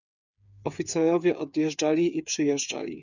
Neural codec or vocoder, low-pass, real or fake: codec, 16 kHz, 8 kbps, FreqCodec, smaller model; 7.2 kHz; fake